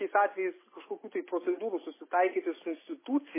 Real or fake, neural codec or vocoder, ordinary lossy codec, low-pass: real; none; MP3, 16 kbps; 3.6 kHz